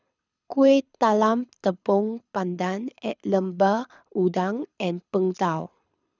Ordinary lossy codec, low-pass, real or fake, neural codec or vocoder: none; 7.2 kHz; fake; codec, 24 kHz, 6 kbps, HILCodec